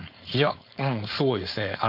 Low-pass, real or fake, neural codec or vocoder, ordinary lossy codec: 5.4 kHz; fake; codec, 16 kHz, 4.8 kbps, FACodec; none